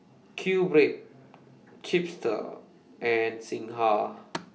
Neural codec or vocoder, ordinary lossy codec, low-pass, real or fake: none; none; none; real